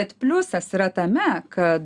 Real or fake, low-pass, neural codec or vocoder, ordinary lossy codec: real; 10.8 kHz; none; Opus, 64 kbps